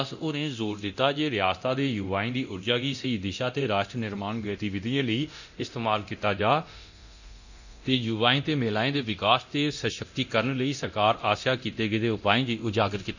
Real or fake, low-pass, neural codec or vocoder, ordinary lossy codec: fake; 7.2 kHz; codec, 24 kHz, 0.9 kbps, DualCodec; none